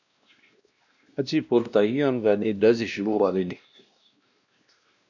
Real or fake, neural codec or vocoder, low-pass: fake; codec, 16 kHz, 1 kbps, X-Codec, WavLM features, trained on Multilingual LibriSpeech; 7.2 kHz